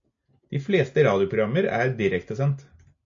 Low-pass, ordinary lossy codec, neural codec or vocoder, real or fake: 7.2 kHz; AAC, 48 kbps; none; real